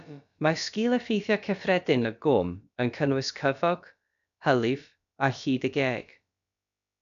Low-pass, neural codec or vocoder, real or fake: 7.2 kHz; codec, 16 kHz, about 1 kbps, DyCAST, with the encoder's durations; fake